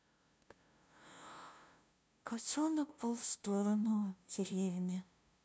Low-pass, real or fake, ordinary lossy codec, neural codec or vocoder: none; fake; none; codec, 16 kHz, 0.5 kbps, FunCodec, trained on LibriTTS, 25 frames a second